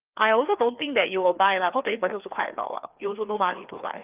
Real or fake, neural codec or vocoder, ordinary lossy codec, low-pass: fake; codec, 16 kHz, 2 kbps, FreqCodec, larger model; Opus, 32 kbps; 3.6 kHz